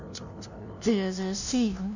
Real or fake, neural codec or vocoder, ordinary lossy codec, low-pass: fake; codec, 16 kHz, 0.5 kbps, FunCodec, trained on LibriTTS, 25 frames a second; none; 7.2 kHz